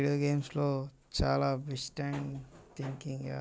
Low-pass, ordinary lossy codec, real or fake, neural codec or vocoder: none; none; real; none